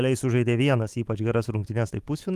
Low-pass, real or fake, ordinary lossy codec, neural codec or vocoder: 14.4 kHz; fake; Opus, 24 kbps; autoencoder, 48 kHz, 128 numbers a frame, DAC-VAE, trained on Japanese speech